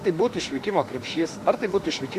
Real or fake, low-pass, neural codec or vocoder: fake; 14.4 kHz; autoencoder, 48 kHz, 32 numbers a frame, DAC-VAE, trained on Japanese speech